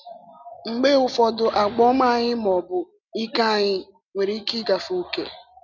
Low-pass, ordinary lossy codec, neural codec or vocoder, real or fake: 7.2 kHz; none; none; real